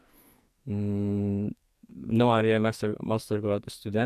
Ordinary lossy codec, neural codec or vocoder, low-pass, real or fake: AAC, 96 kbps; codec, 44.1 kHz, 2.6 kbps, SNAC; 14.4 kHz; fake